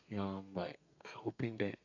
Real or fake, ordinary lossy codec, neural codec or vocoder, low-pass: fake; none; codec, 32 kHz, 1.9 kbps, SNAC; 7.2 kHz